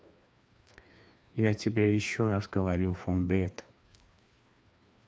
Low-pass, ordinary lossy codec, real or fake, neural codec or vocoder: none; none; fake; codec, 16 kHz, 2 kbps, FreqCodec, larger model